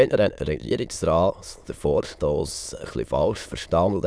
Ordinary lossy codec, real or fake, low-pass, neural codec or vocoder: none; fake; none; autoencoder, 22.05 kHz, a latent of 192 numbers a frame, VITS, trained on many speakers